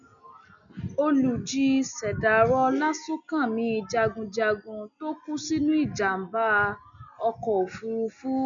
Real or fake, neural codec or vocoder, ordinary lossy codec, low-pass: real; none; none; 7.2 kHz